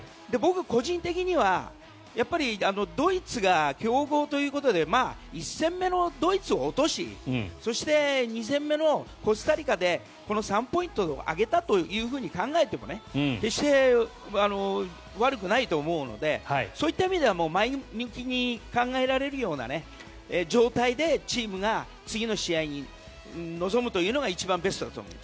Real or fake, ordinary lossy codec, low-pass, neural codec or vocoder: real; none; none; none